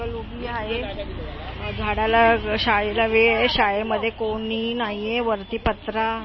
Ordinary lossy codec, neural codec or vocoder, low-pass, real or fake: MP3, 24 kbps; none; 7.2 kHz; real